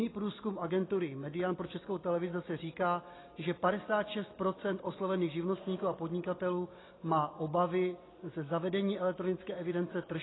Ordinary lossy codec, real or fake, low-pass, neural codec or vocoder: AAC, 16 kbps; real; 7.2 kHz; none